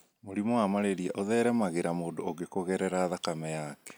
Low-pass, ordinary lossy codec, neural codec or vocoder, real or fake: none; none; none; real